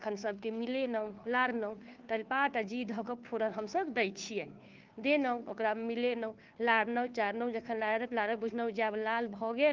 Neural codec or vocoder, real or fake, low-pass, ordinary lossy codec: codec, 16 kHz, 2 kbps, FunCodec, trained on Chinese and English, 25 frames a second; fake; 7.2 kHz; Opus, 32 kbps